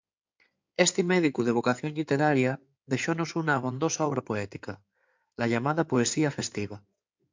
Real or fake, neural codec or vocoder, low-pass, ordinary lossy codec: fake; codec, 16 kHz in and 24 kHz out, 2.2 kbps, FireRedTTS-2 codec; 7.2 kHz; MP3, 64 kbps